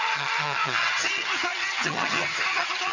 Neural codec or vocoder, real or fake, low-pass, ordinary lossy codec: vocoder, 22.05 kHz, 80 mel bands, HiFi-GAN; fake; 7.2 kHz; none